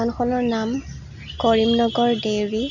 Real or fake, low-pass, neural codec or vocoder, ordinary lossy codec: real; 7.2 kHz; none; none